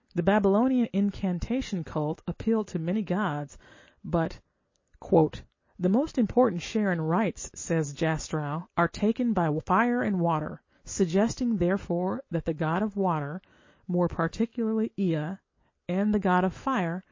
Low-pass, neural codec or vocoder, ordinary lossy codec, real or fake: 7.2 kHz; none; MP3, 32 kbps; real